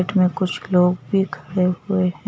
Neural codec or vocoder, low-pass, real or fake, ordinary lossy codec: none; none; real; none